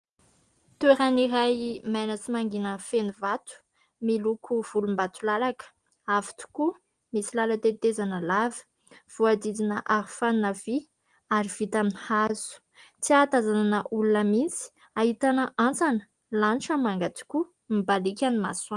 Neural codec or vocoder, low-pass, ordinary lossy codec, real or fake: none; 9.9 kHz; Opus, 24 kbps; real